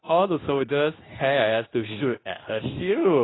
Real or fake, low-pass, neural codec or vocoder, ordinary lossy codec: fake; 7.2 kHz; codec, 24 kHz, 0.9 kbps, WavTokenizer, medium speech release version 2; AAC, 16 kbps